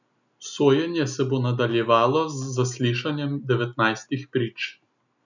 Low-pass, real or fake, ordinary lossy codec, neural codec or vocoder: 7.2 kHz; real; none; none